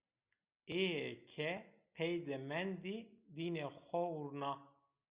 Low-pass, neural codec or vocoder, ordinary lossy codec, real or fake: 3.6 kHz; none; Opus, 64 kbps; real